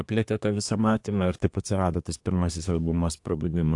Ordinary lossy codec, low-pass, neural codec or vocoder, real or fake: AAC, 64 kbps; 10.8 kHz; codec, 24 kHz, 1 kbps, SNAC; fake